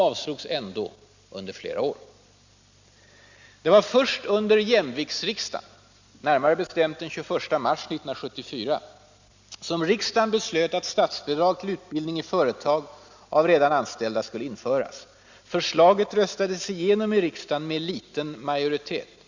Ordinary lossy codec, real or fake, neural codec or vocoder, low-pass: Opus, 64 kbps; real; none; 7.2 kHz